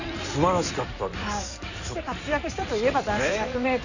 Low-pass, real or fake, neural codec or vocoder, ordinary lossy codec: 7.2 kHz; fake; codec, 16 kHz in and 24 kHz out, 2.2 kbps, FireRedTTS-2 codec; none